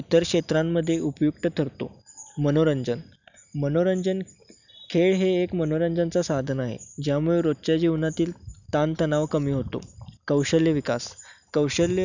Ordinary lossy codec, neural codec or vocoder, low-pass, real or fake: none; none; 7.2 kHz; real